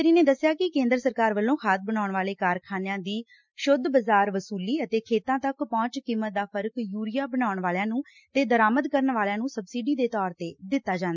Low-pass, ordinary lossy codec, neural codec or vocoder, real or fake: 7.2 kHz; MP3, 64 kbps; none; real